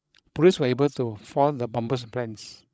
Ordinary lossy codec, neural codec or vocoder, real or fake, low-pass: none; codec, 16 kHz, 16 kbps, FreqCodec, larger model; fake; none